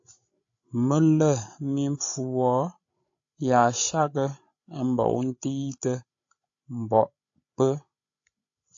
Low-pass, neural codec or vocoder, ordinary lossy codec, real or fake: 7.2 kHz; codec, 16 kHz, 16 kbps, FreqCodec, larger model; AAC, 48 kbps; fake